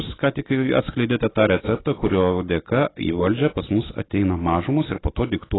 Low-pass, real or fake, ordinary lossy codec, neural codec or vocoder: 7.2 kHz; real; AAC, 16 kbps; none